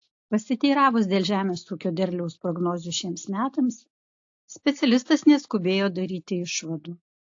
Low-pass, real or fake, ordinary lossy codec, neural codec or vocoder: 7.2 kHz; real; AAC, 48 kbps; none